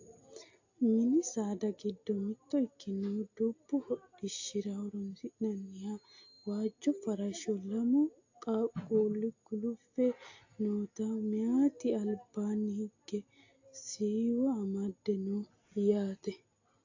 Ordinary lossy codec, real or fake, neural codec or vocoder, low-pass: AAC, 48 kbps; real; none; 7.2 kHz